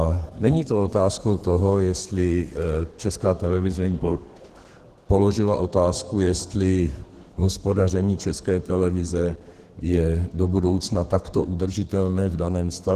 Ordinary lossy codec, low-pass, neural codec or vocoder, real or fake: Opus, 16 kbps; 14.4 kHz; codec, 32 kHz, 1.9 kbps, SNAC; fake